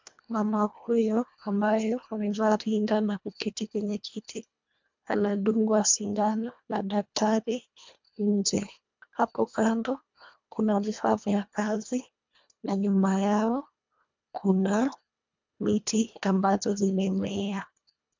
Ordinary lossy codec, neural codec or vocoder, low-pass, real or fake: MP3, 64 kbps; codec, 24 kHz, 1.5 kbps, HILCodec; 7.2 kHz; fake